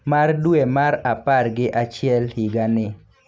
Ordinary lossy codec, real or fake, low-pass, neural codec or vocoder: none; real; none; none